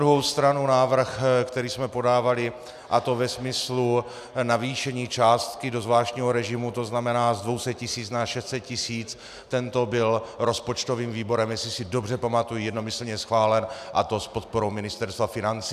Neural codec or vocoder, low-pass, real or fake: none; 14.4 kHz; real